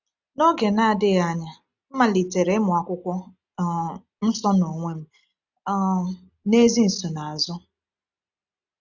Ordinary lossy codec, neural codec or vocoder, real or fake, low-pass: none; none; real; 7.2 kHz